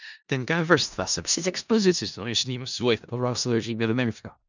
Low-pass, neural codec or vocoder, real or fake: 7.2 kHz; codec, 16 kHz in and 24 kHz out, 0.4 kbps, LongCat-Audio-Codec, four codebook decoder; fake